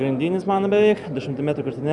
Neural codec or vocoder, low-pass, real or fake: none; 10.8 kHz; real